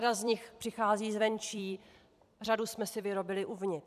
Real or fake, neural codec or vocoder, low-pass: fake; vocoder, 44.1 kHz, 128 mel bands every 256 samples, BigVGAN v2; 14.4 kHz